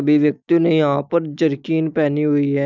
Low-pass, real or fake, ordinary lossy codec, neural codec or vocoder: 7.2 kHz; real; none; none